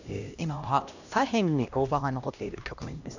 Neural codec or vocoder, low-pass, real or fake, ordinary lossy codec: codec, 16 kHz, 1 kbps, X-Codec, HuBERT features, trained on LibriSpeech; 7.2 kHz; fake; none